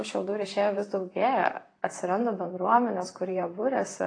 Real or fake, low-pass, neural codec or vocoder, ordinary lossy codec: fake; 9.9 kHz; vocoder, 44.1 kHz, 128 mel bands, Pupu-Vocoder; AAC, 32 kbps